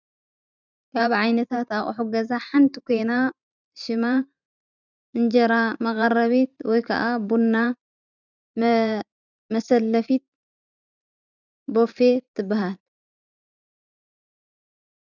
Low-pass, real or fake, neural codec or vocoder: 7.2 kHz; fake; vocoder, 44.1 kHz, 128 mel bands every 512 samples, BigVGAN v2